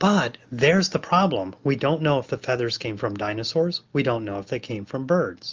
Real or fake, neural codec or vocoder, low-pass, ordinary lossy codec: real; none; 7.2 kHz; Opus, 32 kbps